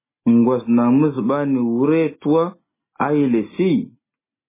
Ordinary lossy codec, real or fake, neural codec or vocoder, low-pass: MP3, 16 kbps; real; none; 3.6 kHz